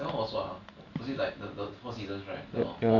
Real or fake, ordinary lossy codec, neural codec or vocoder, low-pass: real; none; none; 7.2 kHz